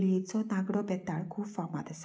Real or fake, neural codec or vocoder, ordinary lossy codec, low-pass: real; none; none; none